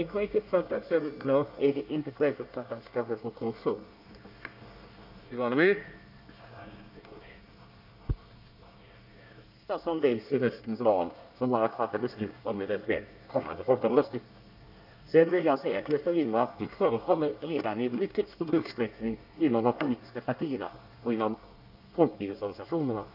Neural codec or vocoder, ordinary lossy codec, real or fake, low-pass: codec, 24 kHz, 1 kbps, SNAC; none; fake; 5.4 kHz